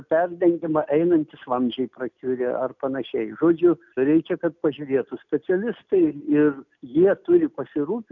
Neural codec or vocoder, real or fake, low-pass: none; real; 7.2 kHz